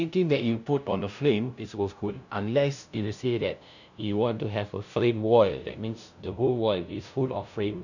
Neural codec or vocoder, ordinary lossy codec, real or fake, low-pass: codec, 16 kHz, 0.5 kbps, FunCodec, trained on LibriTTS, 25 frames a second; none; fake; 7.2 kHz